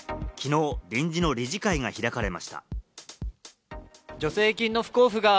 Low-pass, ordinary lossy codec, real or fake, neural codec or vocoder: none; none; real; none